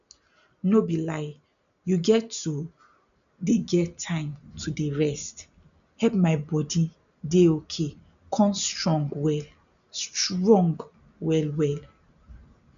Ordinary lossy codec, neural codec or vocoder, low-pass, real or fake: none; none; 7.2 kHz; real